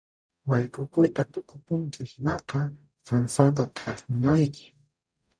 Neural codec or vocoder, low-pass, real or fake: codec, 44.1 kHz, 0.9 kbps, DAC; 9.9 kHz; fake